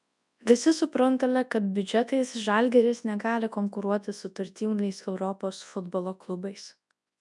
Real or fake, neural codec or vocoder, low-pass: fake; codec, 24 kHz, 0.9 kbps, WavTokenizer, large speech release; 10.8 kHz